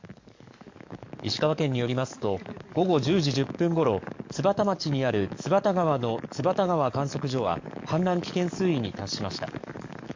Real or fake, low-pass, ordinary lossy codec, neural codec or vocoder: fake; 7.2 kHz; MP3, 48 kbps; codec, 44.1 kHz, 7.8 kbps, DAC